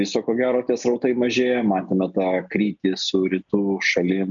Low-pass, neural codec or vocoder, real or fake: 7.2 kHz; none; real